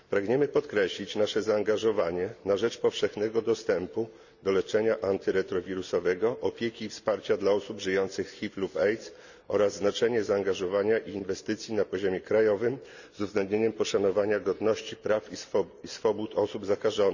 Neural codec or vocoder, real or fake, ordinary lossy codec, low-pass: none; real; none; 7.2 kHz